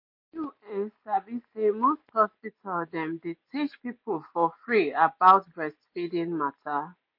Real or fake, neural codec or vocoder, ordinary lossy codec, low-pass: real; none; MP3, 32 kbps; 5.4 kHz